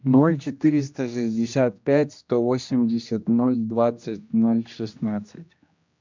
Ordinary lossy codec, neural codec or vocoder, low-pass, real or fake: MP3, 64 kbps; codec, 16 kHz, 1 kbps, X-Codec, HuBERT features, trained on general audio; 7.2 kHz; fake